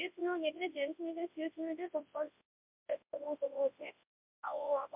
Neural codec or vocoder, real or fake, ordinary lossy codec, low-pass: codec, 24 kHz, 0.9 kbps, WavTokenizer, large speech release; fake; MP3, 32 kbps; 3.6 kHz